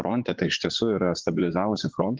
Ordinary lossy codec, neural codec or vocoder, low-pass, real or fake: Opus, 16 kbps; vocoder, 22.05 kHz, 80 mel bands, Vocos; 7.2 kHz; fake